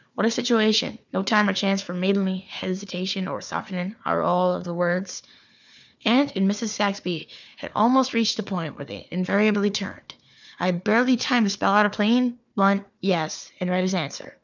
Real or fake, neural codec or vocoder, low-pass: fake; codec, 16 kHz, 4 kbps, FunCodec, trained on Chinese and English, 50 frames a second; 7.2 kHz